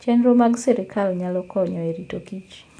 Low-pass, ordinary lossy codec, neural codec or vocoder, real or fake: 9.9 kHz; AAC, 48 kbps; none; real